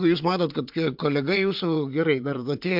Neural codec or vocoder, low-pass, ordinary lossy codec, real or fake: vocoder, 22.05 kHz, 80 mel bands, WaveNeXt; 5.4 kHz; MP3, 48 kbps; fake